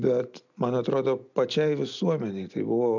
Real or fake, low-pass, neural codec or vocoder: real; 7.2 kHz; none